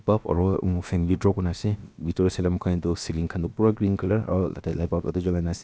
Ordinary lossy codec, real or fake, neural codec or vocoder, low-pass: none; fake; codec, 16 kHz, about 1 kbps, DyCAST, with the encoder's durations; none